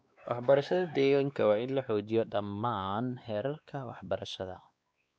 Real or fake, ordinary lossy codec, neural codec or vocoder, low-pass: fake; none; codec, 16 kHz, 2 kbps, X-Codec, WavLM features, trained on Multilingual LibriSpeech; none